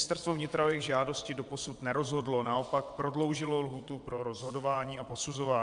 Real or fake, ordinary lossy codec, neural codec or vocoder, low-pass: fake; AAC, 64 kbps; vocoder, 22.05 kHz, 80 mel bands, WaveNeXt; 9.9 kHz